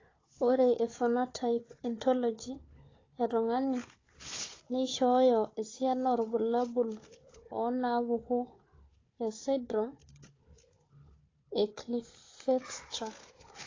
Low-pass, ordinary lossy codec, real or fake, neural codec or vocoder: 7.2 kHz; AAC, 32 kbps; fake; codec, 16 kHz, 4 kbps, FunCodec, trained on Chinese and English, 50 frames a second